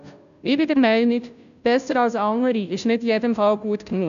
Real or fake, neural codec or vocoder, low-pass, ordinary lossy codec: fake; codec, 16 kHz, 0.5 kbps, FunCodec, trained on Chinese and English, 25 frames a second; 7.2 kHz; none